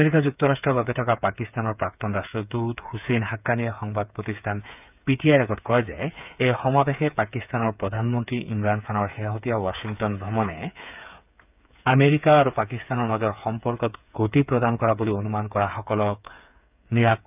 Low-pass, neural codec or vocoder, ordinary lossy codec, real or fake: 3.6 kHz; codec, 16 kHz, 8 kbps, FreqCodec, smaller model; none; fake